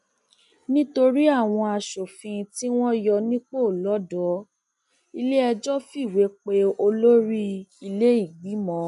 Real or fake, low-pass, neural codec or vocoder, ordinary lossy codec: real; 10.8 kHz; none; none